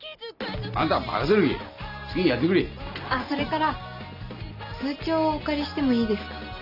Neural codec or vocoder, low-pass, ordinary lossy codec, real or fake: none; 5.4 kHz; none; real